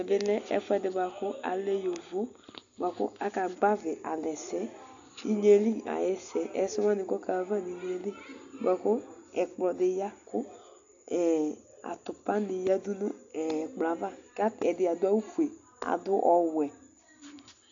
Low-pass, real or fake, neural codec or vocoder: 7.2 kHz; real; none